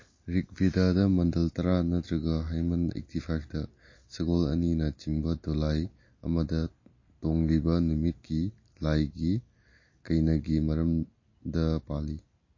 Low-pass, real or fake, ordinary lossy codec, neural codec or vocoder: 7.2 kHz; real; MP3, 32 kbps; none